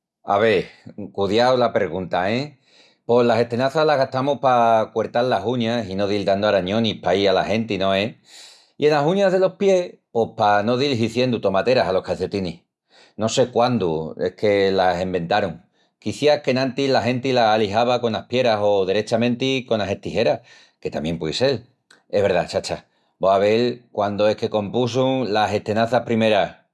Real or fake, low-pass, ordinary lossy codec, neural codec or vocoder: real; none; none; none